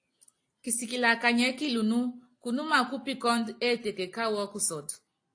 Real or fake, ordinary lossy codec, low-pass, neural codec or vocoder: real; AAC, 48 kbps; 9.9 kHz; none